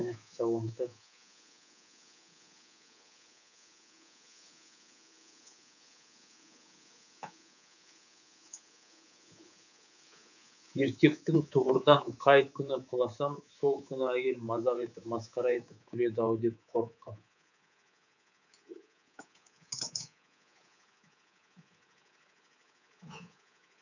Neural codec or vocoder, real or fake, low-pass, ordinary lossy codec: codec, 24 kHz, 3.1 kbps, DualCodec; fake; 7.2 kHz; none